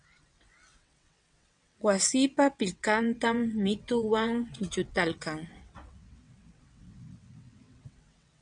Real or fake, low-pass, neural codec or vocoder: fake; 9.9 kHz; vocoder, 22.05 kHz, 80 mel bands, WaveNeXt